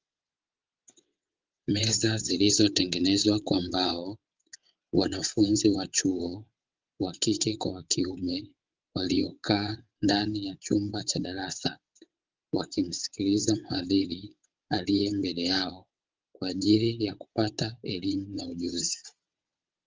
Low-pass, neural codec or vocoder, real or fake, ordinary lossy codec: 7.2 kHz; vocoder, 22.05 kHz, 80 mel bands, WaveNeXt; fake; Opus, 32 kbps